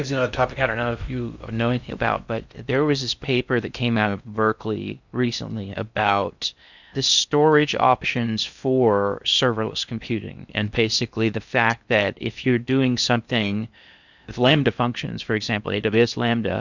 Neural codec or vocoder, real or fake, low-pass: codec, 16 kHz in and 24 kHz out, 0.6 kbps, FocalCodec, streaming, 2048 codes; fake; 7.2 kHz